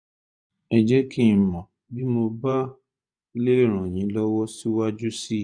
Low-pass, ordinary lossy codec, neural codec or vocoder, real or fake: 9.9 kHz; none; vocoder, 24 kHz, 100 mel bands, Vocos; fake